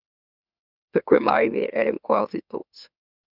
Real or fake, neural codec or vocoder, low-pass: fake; autoencoder, 44.1 kHz, a latent of 192 numbers a frame, MeloTTS; 5.4 kHz